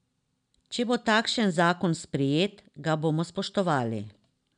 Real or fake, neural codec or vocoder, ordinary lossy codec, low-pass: real; none; none; 9.9 kHz